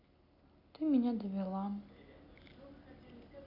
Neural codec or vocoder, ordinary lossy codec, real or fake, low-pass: none; none; real; 5.4 kHz